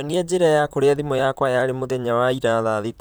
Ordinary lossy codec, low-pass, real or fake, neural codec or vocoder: none; none; fake; vocoder, 44.1 kHz, 128 mel bands, Pupu-Vocoder